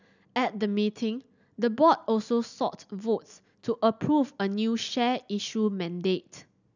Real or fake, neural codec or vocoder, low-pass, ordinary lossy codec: real; none; 7.2 kHz; none